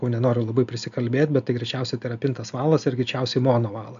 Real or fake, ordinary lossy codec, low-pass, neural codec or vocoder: real; AAC, 96 kbps; 7.2 kHz; none